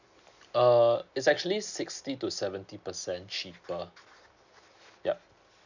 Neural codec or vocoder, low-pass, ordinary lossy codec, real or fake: none; 7.2 kHz; none; real